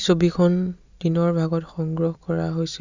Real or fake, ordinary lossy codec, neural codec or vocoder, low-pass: real; Opus, 64 kbps; none; 7.2 kHz